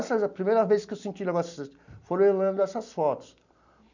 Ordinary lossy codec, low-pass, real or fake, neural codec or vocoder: none; 7.2 kHz; real; none